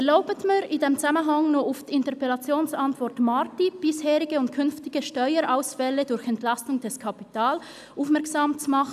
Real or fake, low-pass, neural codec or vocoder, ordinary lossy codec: real; 14.4 kHz; none; none